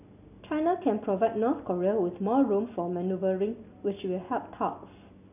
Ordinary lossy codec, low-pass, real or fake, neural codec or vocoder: none; 3.6 kHz; real; none